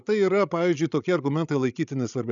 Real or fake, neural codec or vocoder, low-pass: fake; codec, 16 kHz, 16 kbps, FreqCodec, larger model; 7.2 kHz